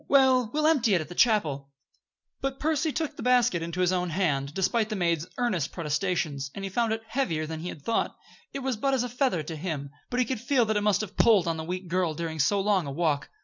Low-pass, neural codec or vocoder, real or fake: 7.2 kHz; none; real